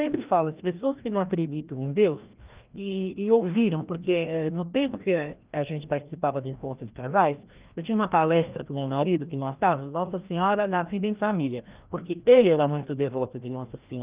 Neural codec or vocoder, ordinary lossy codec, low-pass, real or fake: codec, 16 kHz, 1 kbps, FreqCodec, larger model; Opus, 24 kbps; 3.6 kHz; fake